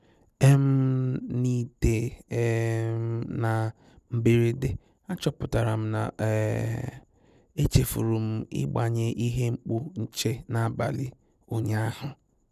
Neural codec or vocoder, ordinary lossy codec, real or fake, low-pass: none; none; real; 14.4 kHz